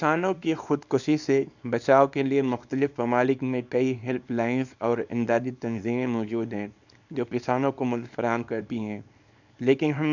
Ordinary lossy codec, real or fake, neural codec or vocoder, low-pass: none; fake; codec, 24 kHz, 0.9 kbps, WavTokenizer, small release; 7.2 kHz